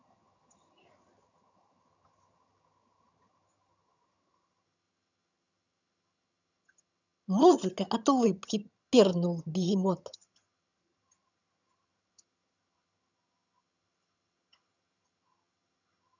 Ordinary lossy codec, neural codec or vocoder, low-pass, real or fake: none; vocoder, 22.05 kHz, 80 mel bands, HiFi-GAN; 7.2 kHz; fake